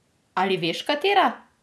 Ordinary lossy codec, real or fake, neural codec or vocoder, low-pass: none; real; none; none